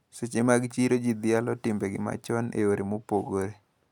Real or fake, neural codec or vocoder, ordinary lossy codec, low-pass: real; none; none; 19.8 kHz